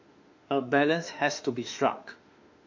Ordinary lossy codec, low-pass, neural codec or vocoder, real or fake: MP3, 48 kbps; 7.2 kHz; autoencoder, 48 kHz, 32 numbers a frame, DAC-VAE, trained on Japanese speech; fake